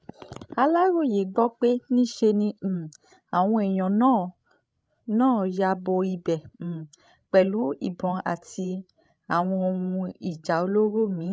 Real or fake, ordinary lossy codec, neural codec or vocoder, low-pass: fake; none; codec, 16 kHz, 16 kbps, FreqCodec, larger model; none